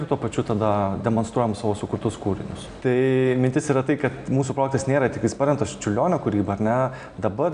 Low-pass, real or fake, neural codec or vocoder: 9.9 kHz; real; none